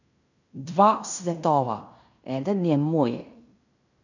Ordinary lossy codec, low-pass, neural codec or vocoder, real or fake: none; 7.2 kHz; codec, 16 kHz in and 24 kHz out, 0.9 kbps, LongCat-Audio-Codec, fine tuned four codebook decoder; fake